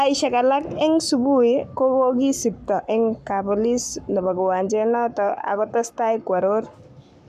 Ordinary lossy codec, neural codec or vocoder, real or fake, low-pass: none; codec, 44.1 kHz, 7.8 kbps, Pupu-Codec; fake; 14.4 kHz